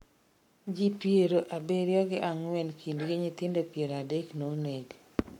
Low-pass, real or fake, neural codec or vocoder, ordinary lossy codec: 19.8 kHz; fake; codec, 44.1 kHz, 7.8 kbps, Pupu-Codec; MP3, 96 kbps